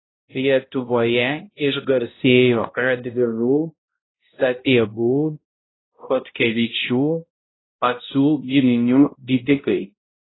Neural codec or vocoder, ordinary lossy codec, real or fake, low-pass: codec, 16 kHz, 0.5 kbps, X-Codec, HuBERT features, trained on balanced general audio; AAC, 16 kbps; fake; 7.2 kHz